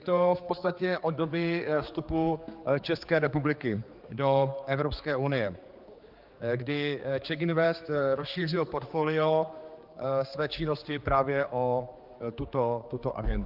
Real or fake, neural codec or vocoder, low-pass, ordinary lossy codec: fake; codec, 16 kHz, 4 kbps, X-Codec, HuBERT features, trained on general audio; 5.4 kHz; Opus, 32 kbps